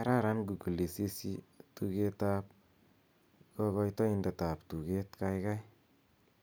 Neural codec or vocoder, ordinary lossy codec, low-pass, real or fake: none; none; none; real